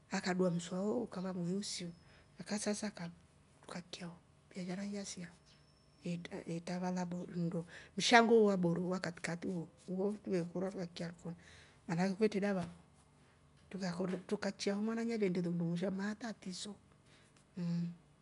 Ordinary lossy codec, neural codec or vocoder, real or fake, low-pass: none; none; real; 10.8 kHz